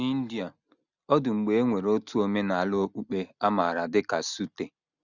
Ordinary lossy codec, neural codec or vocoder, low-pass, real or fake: none; none; 7.2 kHz; real